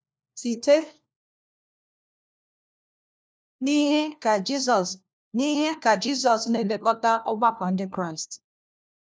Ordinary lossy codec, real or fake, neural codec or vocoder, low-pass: none; fake; codec, 16 kHz, 1 kbps, FunCodec, trained on LibriTTS, 50 frames a second; none